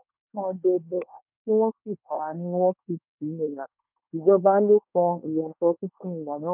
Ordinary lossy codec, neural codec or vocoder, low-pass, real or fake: none; codec, 24 kHz, 1 kbps, SNAC; 3.6 kHz; fake